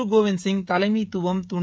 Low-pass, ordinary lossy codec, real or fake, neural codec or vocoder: none; none; fake; codec, 16 kHz, 16 kbps, FreqCodec, smaller model